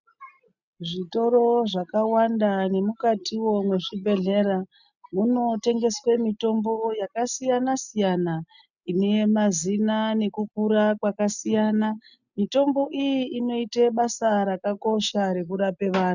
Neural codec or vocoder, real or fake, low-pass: none; real; 7.2 kHz